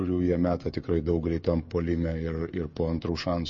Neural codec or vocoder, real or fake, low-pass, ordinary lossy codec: codec, 16 kHz, 16 kbps, FreqCodec, smaller model; fake; 7.2 kHz; MP3, 32 kbps